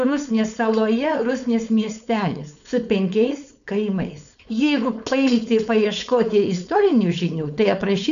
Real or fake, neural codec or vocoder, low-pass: fake; codec, 16 kHz, 4.8 kbps, FACodec; 7.2 kHz